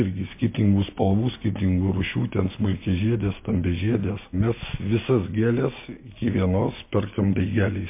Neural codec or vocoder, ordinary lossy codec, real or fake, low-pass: none; MP3, 24 kbps; real; 3.6 kHz